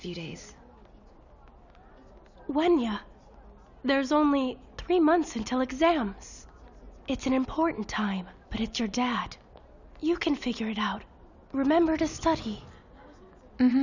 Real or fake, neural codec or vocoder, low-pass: real; none; 7.2 kHz